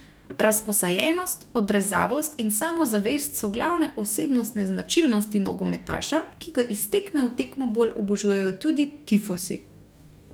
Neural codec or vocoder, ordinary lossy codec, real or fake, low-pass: codec, 44.1 kHz, 2.6 kbps, DAC; none; fake; none